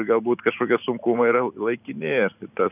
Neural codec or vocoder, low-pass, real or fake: vocoder, 44.1 kHz, 128 mel bands every 512 samples, BigVGAN v2; 3.6 kHz; fake